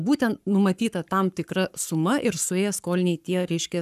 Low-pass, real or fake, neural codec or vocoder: 14.4 kHz; fake; codec, 44.1 kHz, 7.8 kbps, Pupu-Codec